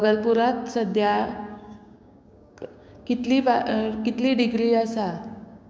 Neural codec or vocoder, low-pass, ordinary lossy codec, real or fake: codec, 16 kHz, 6 kbps, DAC; none; none; fake